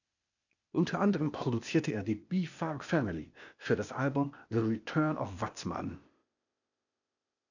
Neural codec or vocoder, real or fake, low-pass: codec, 16 kHz, 0.8 kbps, ZipCodec; fake; 7.2 kHz